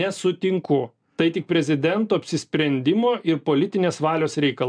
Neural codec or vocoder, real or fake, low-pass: none; real; 9.9 kHz